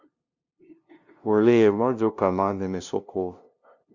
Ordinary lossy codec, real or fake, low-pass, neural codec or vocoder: Opus, 64 kbps; fake; 7.2 kHz; codec, 16 kHz, 0.5 kbps, FunCodec, trained on LibriTTS, 25 frames a second